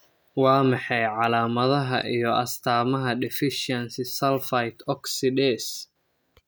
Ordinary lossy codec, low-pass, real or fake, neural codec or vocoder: none; none; real; none